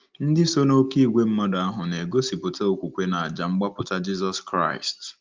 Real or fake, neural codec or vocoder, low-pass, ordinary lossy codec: real; none; 7.2 kHz; Opus, 24 kbps